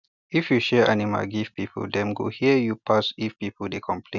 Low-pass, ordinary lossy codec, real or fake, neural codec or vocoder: 7.2 kHz; none; real; none